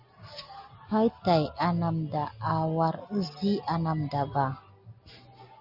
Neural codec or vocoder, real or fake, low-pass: none; real; 5.4 kHz